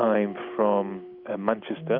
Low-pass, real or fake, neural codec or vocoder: 5.4 kHz; real; none